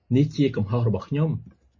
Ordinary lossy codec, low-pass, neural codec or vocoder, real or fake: MP3, 32 kbps; 7.2 kHz; none; real